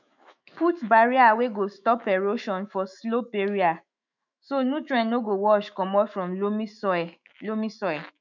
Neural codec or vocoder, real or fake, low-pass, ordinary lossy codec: autoencoder, 48 kHz, 128 numbers a frame, DAC-VAE, trained on Japanese speech; fake; 7.2 kHz; none